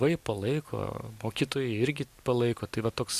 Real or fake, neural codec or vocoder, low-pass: real; none; 14.4 kHz